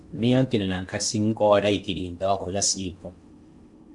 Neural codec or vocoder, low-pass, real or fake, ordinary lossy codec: codec, 16 kHz in and 24 kHz out, 0.8 kbps, FocalCodec, streaming, 65536 codes; 10.8 kHz; fake; MP3, 64 kbps